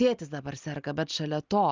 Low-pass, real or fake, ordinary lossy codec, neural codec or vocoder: 7.2 kHz; real; Opus, 32 kbps; none